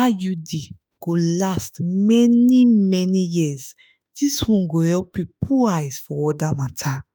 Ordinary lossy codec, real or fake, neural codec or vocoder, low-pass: none; fake; autoencoder, 48 kHz, 32 numbers a frame, DAC-VAE, trained on Japanese speech; none